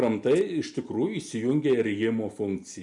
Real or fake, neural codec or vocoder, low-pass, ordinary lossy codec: real; none; 10.8 kHz; MP3, 96 kbps